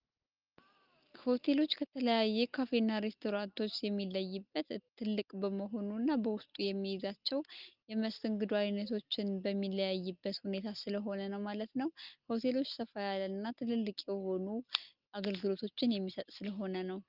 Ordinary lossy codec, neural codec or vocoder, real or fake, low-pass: Opus, 24 kbps; none; real; 5.4 kHz